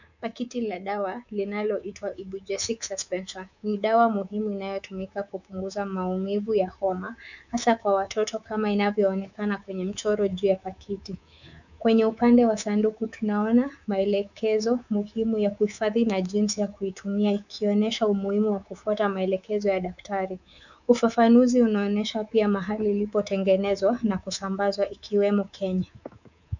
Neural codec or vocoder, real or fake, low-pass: codec, 24 kHz, 3.1 kbps, DualCodec; fake; 7.2 kHz